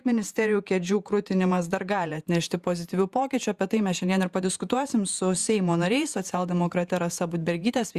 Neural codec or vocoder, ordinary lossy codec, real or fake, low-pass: vocoder, 48 kHz, 128 mel bands, Vocos; Opus, 64 kbps; fake; 14.4 kHz